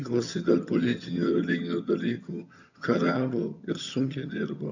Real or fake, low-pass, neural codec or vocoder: fake; 7.2 kHz; vocoder, 22.05 kHz, 80 mel bands, HiFi-GAN